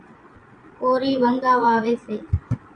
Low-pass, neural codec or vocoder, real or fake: 9.9 kHz; vocoder, 22.05 kHz, 80 mel bands, Vocos; fake